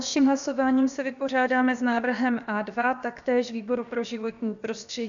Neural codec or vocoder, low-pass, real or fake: codec, 16 kHz, about 1 kbps, DyCAST, with the encoder's durations; 7.2 kHz; fake